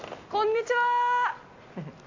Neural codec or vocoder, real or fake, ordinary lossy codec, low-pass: none; real; AAC, 48 kbps; 7.2 kHz